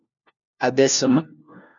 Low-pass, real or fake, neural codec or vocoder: 7.2 kHz; fake; codec, 16 kHz, 1 kbps, FunCodec, trained on LibriTTS, 50 frames a second